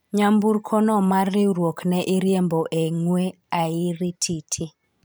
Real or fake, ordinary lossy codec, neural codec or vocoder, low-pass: fake; none; vocoder, 44.1 kHz, 128 mel bands every 512 samples, BigVGAN v2; none